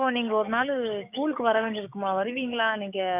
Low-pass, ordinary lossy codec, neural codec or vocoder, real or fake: 3.6 kHz; none; codec, 16 kHz, 6 kbps, DAC; fake